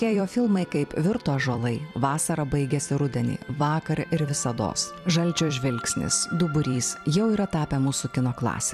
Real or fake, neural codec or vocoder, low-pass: fake; vocoder, 44.1 kHz, 128 mel bands every 256 samples, BigVGAN v2; 14.4 kHz